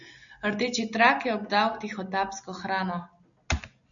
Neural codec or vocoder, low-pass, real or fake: none; 7.2 kHz; real